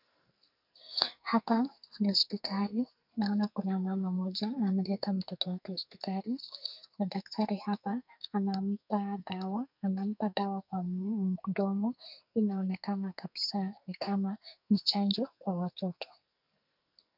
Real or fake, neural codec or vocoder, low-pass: fake; codec, 44.1 kHz, 2.6 kbps, SNAC; 5.4 kHz